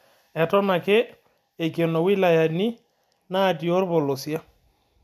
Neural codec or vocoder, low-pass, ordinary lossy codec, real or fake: none; 14.4 kHz; MP3, 96 kbps; real